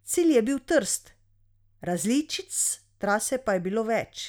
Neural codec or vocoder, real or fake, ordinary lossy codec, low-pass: none; real; none; none